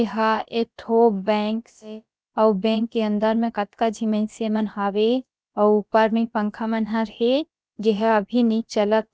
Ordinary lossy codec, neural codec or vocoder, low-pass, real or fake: none; codec, 16 kHz, about 1 kbps, DyCAST, with the encoder's durations; none; fake